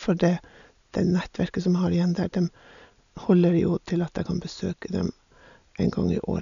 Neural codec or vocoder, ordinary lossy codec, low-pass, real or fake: none; none; 7.2 kHz; real